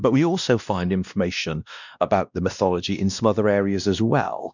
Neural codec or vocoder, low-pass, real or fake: codec, 16 kHz, 1 kbps, X-Codec, WavLM features, trained on Multilingual LibriSpeech; 7.2 kHz; fake